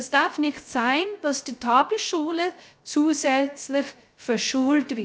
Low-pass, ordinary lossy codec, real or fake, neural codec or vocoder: none; none; fake; codec, 16 kHz, 0.3 kbps, FocalCodec